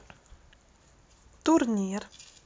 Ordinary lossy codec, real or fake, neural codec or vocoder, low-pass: none; real; none; none